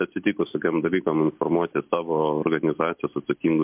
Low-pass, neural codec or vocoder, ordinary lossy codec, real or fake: 3.6 kHz; none; MP3, 32 kbps; real